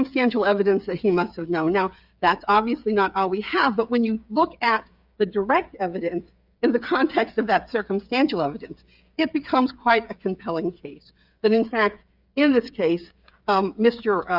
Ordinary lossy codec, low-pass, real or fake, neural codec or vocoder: Opus, 64 kbps; 5.4 kHz; fake; codec, 16 kHz, 8 kbps, FreqCodec, smaller model